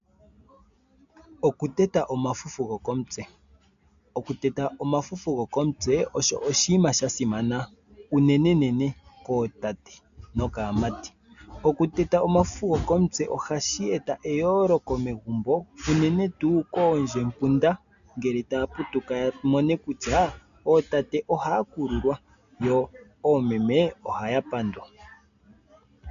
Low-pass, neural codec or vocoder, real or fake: 7.2 kHz; none; real